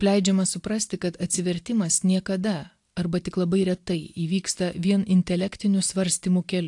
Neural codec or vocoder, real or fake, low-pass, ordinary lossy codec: none; real; 10.8 kHz; AAC, 64 kbps